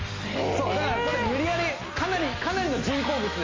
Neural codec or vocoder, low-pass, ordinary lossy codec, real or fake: none; 7.2 kHz; MP3, 48 kbps; real